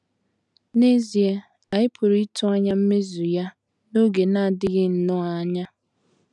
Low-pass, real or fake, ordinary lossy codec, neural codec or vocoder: 10.8 kHz; real; none; none